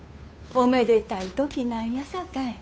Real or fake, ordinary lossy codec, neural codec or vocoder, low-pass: fake; none; codec, 16 kHz, 2 kbps, FunCodec, trained on Chinese and English, 25 frames a second; none